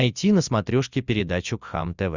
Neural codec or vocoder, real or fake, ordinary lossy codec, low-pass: none; real; Opus, 64 kbps; 7.2 kHz